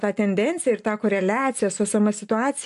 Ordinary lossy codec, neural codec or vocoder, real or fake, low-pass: AAC, 64 kbps; none; real; 10.8 kHz